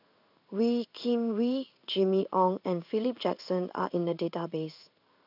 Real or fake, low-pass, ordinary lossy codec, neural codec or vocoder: fake; 5.4 kHz; none; codec, 16 kHz in and 24 kHz out, 1 kbps, XY-Tokenizer